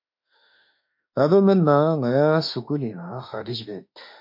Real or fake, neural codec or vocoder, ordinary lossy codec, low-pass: fake; autoencoder, 48 kHz, 32 numbers a frame, DAC-VAE, trained on Japanese speech; MP3, 32 kbps; 5.4 kHz